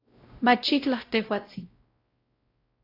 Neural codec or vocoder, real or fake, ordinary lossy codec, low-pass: codec, 16 kHz, 0.5 kbps, X-Codec, WavLM features, trained on Multilingual LibriSpeech; fake; AAC, 24 kbps; 5.4 kHz